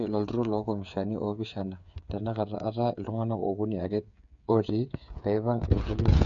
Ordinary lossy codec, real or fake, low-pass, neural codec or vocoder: none; fake; 7.2 kHz; codec, 16 kHz, 8 kbps, FreqCodec, smaller model